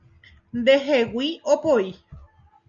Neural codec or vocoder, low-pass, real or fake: none; 7.2 kHz; real